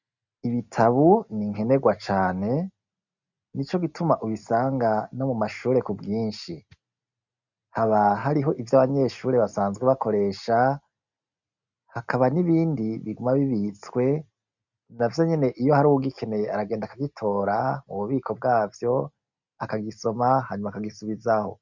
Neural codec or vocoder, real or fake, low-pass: none; real; 7.2 kHz